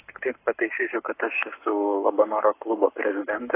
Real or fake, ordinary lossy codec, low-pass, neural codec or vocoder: fake; AAC, 24 kbps; 3.6 kHz; codec, 44.1 kHz, 7.8 kbps, Pupu-Codec